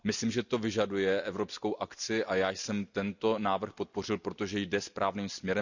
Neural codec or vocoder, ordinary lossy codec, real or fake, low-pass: none; none; real; 7.2 kHz